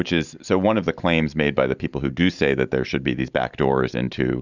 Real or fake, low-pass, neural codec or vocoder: fake; 7.2 kHz; vocoder, 44.1 kHz, 128 mel bands every 512 samples, BigVGAN v2